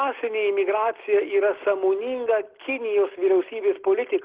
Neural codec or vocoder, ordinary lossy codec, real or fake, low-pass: none; Opus, 16 kbps; real; 3.6 kHz